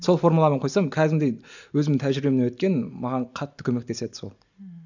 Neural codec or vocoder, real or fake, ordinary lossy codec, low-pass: none; real; none; 7.2 kHz